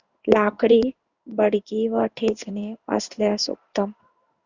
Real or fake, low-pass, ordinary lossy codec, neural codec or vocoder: fake; 7.2 kHz; Opus, 64 kbps; codec, 16 kHz in and 24 kHz out, 1 kbps, XY-Tokenizer